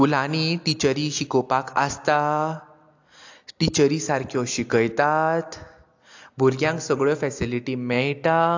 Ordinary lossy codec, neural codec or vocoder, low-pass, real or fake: AAC, 48 kbps; none; 7.2 kHz; real